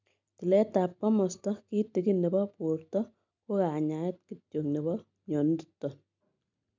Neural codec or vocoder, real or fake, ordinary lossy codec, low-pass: none; real; MP3, 64 kbps; 7.2 kHz